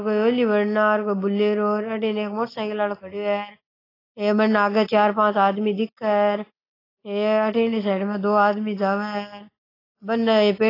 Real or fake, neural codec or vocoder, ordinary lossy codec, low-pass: real; none; AAC, 24 kbps; 5.4 kHz